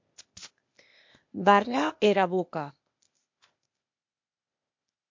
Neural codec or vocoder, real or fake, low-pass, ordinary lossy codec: codec, 16 kHz, 0.8 kbps, ZipCodec; fake; 7.2 kHz; MP3, 48 kbps